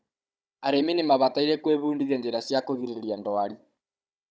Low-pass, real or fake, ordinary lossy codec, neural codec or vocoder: none; fake; none; codec, 16 kHz, 16 kbps, FunCodec, trained on Chinese and English, 50 frames a second